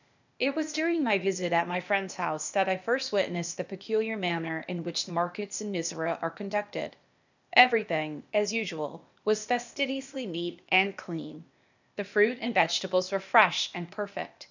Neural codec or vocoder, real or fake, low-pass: codec, 16 kHz, 0.8 kbps, ZipCodec; fake; 7.2 kHz